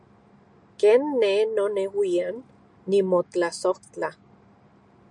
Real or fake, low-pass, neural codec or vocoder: real; 10.8 kHz; none